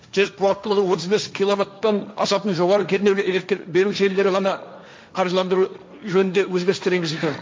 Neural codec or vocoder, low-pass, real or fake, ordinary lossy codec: codec, 16 kHz, 1.1 kbps, Voila-Tokenizer; none; fake; none